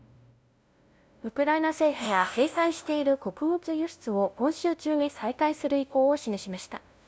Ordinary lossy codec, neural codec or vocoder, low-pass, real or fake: none; codec, 16 kHz, 0.5 kbps, FunCodec, trained on LibriTTS, 25 frames a second; none; fake